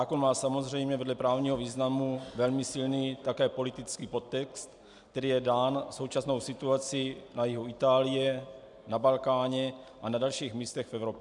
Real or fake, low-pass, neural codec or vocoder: real; 10.8 kHz; none